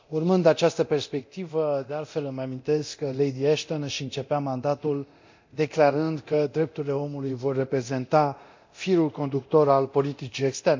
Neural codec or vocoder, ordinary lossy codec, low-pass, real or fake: codec, 24 kHz, 0.9 kbps, DualCodec; MP3, 64 kbps; 7.2 kHz; fake